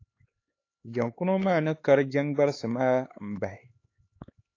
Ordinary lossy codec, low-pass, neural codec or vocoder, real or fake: AAC, 32 kbps; 7.2 kHz; codec, 16 kHz, 4 kbps, X-Codec, HuBERT features, trained on LibriSpeech; fake